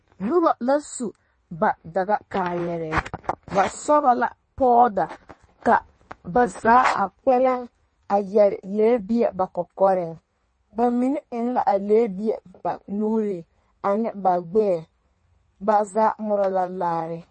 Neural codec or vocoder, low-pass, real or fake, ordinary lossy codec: codec, 16 kHz in and 24 kHz out, 1.1 kbps, FireRedTTS-2 codec; 9.9 kHz; fake; MP3, 32 kbps